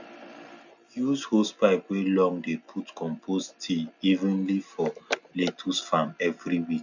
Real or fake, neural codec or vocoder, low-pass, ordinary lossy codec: real; none; 7.2 kHz; none